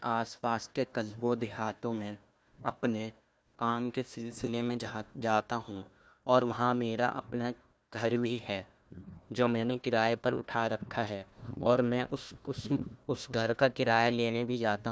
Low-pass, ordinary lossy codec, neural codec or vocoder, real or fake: none; none; codec, 16 kHz, 1 kbps, FunCodec, trained on Chinese and English, 50 frames a second; fake